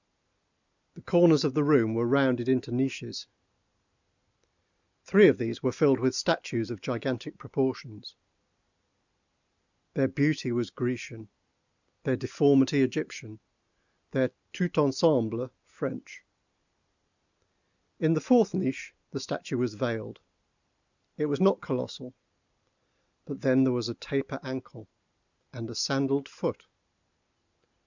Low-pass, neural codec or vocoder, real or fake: 7.2 kHz; none; real